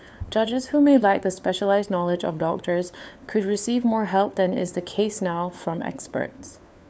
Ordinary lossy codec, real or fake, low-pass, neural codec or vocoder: none; fake; none; codec, 16 kHz, 4 kbps, FunCodec, trained on LibriTTS, 50 frames a second